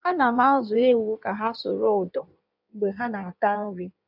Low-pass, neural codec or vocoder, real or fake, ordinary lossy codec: 5.4 kHz; codec, 16 kHz in and 24 kHz out, 1.1 kbps, FireRedTTS-2 codec; fake; none